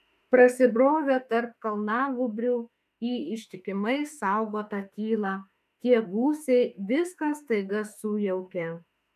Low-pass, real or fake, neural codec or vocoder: 14.4 kHz; fake; autoencoder, 48 kHz, 32 numbers a frame, DAC-VAE, trained on Japanese speech